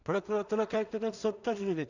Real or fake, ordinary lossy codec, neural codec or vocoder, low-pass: fake; none; codec, 16 kHz in and 24 kHz out, 0.4 kbps, LongCat-Audio-Codec, two codebook decoder; 7.2 kHz